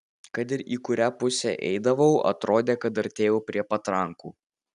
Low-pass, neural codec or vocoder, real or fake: 10.8 kHz; none; real